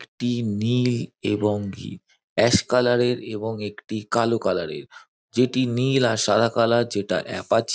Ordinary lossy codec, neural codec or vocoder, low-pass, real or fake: none; none; none; real